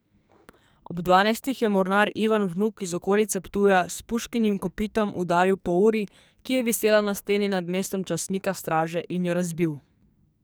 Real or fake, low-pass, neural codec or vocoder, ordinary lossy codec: fake; none; codec, 44.1 kHz, 2.6 kbps, SNAC; none